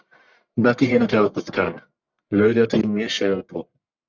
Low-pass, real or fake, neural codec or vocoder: 7.2 kHz; fake; codec, 44.1 kHz, 1.7 kbps, Pupu-Codec